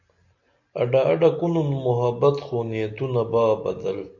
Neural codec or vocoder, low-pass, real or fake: none; 7.2 kHz; real